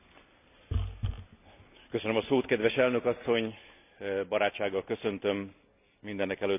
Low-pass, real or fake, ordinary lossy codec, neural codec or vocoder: 3.6 kHz; real; none; none